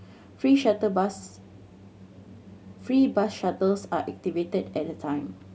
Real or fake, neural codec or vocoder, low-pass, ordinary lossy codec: real; none; none; none